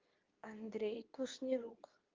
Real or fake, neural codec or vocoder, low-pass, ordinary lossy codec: fake; codec, 24 kHz, 0.9 kbps, WavTokenizer, medium speech release version 2; 7.2 kHz; Opus, 24 kbps